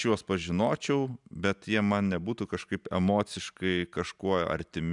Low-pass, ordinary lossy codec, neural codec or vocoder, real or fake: 10.8 kHz; MP3, 96 kbps; none; real